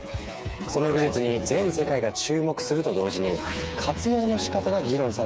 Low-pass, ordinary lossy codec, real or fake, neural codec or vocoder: none; none; fake; codec, 16 kHz, 4 kbps, FreqCodec, smaller model